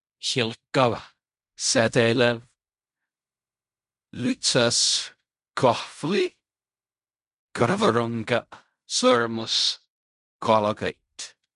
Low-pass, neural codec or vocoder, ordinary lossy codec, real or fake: 10.8 kHz; codec, 16 kHz in and 24 kHz out, 0.4 kbps, LongCat-Audio-Codec, fine tuned four codebook decoder; MP3, 96 kbps; fake